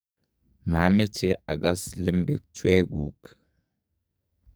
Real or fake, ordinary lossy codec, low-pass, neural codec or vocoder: fake; none; none; codec, 44.1 kHz, 2.6 kbps, SNAC